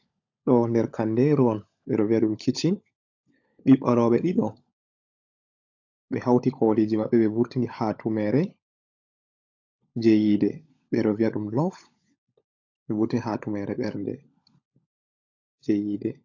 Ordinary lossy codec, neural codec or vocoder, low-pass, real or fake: AAC, 48 kbps; codec, 16 kHz, 16 kbps, FunCodec, trained on LibriTTS, 50 frames a second; 7.2 kHz; fake